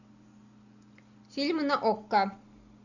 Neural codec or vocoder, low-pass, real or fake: none; 7.2 kHz; real